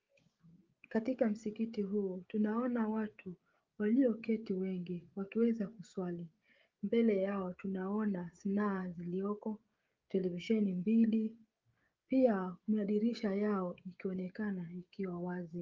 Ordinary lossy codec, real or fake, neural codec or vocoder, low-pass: Opus, 32 kbps; fake; codec, 16 kHz, 16 kbps, FreqCodec, smaller model; 7.2 kHz